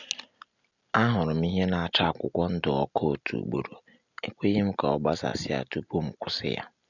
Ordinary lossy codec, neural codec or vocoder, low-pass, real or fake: none; none; 7.2 kHz; real